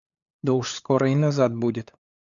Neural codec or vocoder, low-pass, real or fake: codec, 16 kHz, 8 kbps, FunCodec, trained on LibriTTS, 25 frames a second; 7.2 kHz; fake